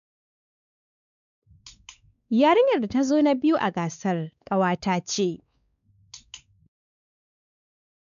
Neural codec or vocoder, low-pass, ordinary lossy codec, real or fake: codec, 16 kHz, 4 kbps, X-Codec, WavLM features, trained on Multilingual LibriSpeech; 7.2 kHz; none; fake